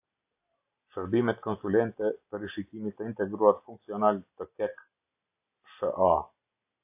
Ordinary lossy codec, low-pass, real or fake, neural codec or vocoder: AAC, 32 kbps; 3.6 kHz; real; none